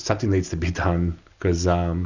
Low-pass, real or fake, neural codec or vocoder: 7.2 kHz; real; none